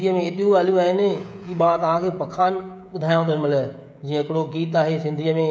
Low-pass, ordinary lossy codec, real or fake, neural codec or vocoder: none; none; fake; codec, 16 kHz, 16 kbps, FreqCodec, smaller model